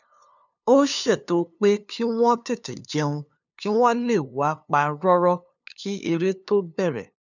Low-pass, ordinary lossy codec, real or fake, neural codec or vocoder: 7.2 kHz; none; fake; codec, 16 kHz, 2 kbps, FunCodec, trained on LibriTTS, 25 frames a second